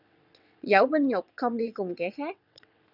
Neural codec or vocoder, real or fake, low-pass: vocoder, 22.05 kHz, 80 mel bands, WaveNeXt; fake; 5.4 kHz